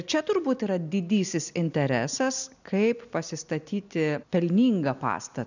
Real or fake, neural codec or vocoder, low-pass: real; none; 7.2 kHz